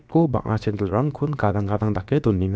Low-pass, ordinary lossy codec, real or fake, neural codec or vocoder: none; none; fake; codec, 16 kHz, about 1 kbps, DyCAST, with the encoder's durations